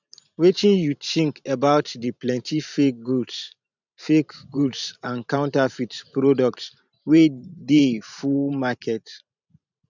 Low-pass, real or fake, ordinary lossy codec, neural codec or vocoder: 7.2 kHz; fake; none; vocoder, 44.1 kHz, 128 mel bands every 512 samples, BigVGAN v2